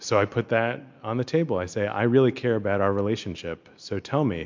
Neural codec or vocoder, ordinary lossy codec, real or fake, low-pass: none; MP3, 64 kbps; real; 7.2 kHz